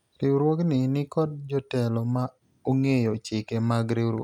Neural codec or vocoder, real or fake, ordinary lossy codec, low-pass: none; real; none; 19.8 kHz